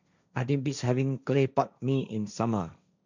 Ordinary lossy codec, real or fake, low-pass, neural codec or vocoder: none; fake; 7.2 kHz; codec, 16 kHz, 1.1 kbps, Voila-Tokenizer